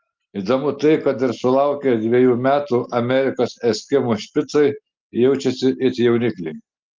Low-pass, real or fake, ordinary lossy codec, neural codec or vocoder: 7.2 kHz; real; Opus, 32 kbps; none